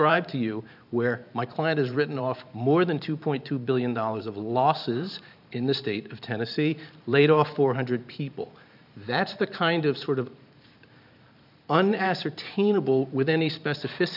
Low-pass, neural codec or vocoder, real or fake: 5.4 kHz; vocoder, 44.1 kHz, 128 mel bands every 512 samples, BigVGAN v2; fake